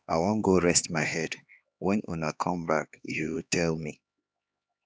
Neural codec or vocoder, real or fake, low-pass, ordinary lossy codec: codec, 16 kHz, 2 kbps, X-Codec, HuBERT features, trained on LibriSpeech; fake; none; none